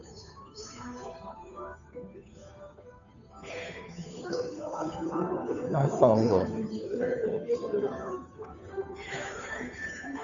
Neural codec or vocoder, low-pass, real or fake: codec, 16 kHz, 2 kbps, FunCodec, trained on Chinese and English, 25 frames a second; 7.2 kHz; fake